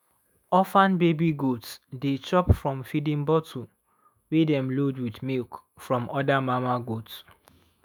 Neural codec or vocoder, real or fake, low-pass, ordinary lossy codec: autoencoder, 48 kHz, 128 numbers a frame, DAC-VAE, trained on Japanese speech; fake; none; none